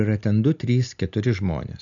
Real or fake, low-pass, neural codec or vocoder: real; 7.2 kHz; none